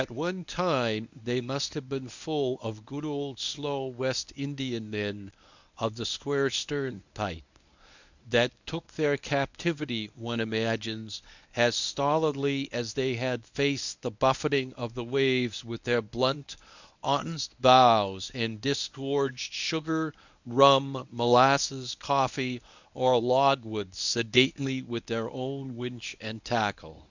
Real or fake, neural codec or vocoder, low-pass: fake; codec, 24 kHz, 0.9 kbps, WavTokenizer, medium speech release version 1; 7.2 kHz